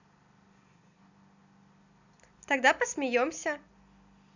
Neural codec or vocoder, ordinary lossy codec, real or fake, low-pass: none; none; real; 7.2 kHz